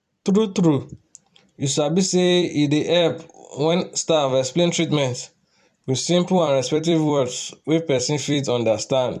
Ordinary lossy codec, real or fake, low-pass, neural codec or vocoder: none; fake; 14.4 kHz; vocoder, 44.1 kHz, 128 mel bands every 256 samples, BigVGAN v2